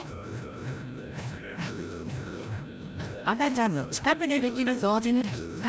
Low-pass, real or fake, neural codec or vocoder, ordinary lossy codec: none; fake; codec, 16 kHz, 0.5 kbps, FreqCodec, larger model; none